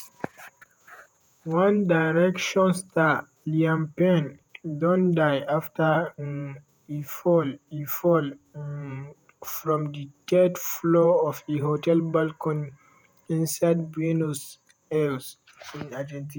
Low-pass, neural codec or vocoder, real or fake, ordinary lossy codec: none; vocoder, 48 kHz, 128 mel bands, Vocos; fake; none